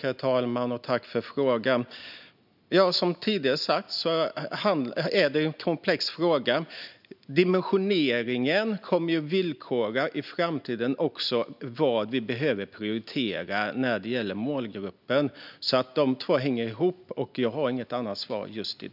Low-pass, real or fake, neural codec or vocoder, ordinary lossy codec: 5.4 kHz; real; none; none